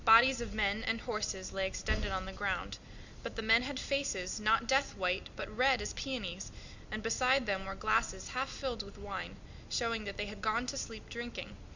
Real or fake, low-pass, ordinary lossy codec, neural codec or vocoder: real; 7.2 kHz; Opus, 64 kbps; none